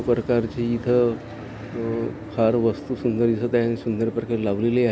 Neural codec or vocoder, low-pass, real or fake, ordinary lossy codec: none; none; real; none